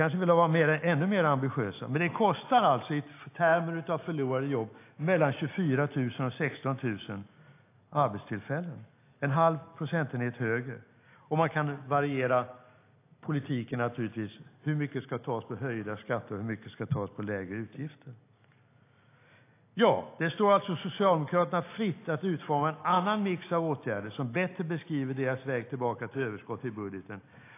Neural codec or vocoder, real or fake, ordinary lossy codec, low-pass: none; real; AAC, 24 kbps; 3.6 kHz